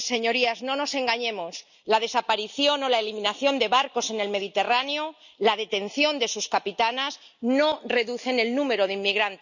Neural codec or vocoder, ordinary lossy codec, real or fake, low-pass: none; none; real; 7.2 kHz